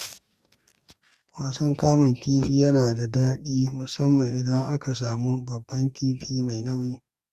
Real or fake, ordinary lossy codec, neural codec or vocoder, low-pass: fake; Opus, 64 kbps; codec, 44.1 kHz, 2.6 kbps, DAC; 14.4 kHz